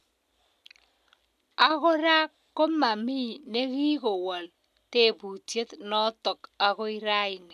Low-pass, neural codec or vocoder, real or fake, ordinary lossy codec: 14.4 kHz; none; real; none